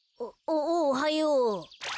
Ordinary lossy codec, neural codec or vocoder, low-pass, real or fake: none; none; none; real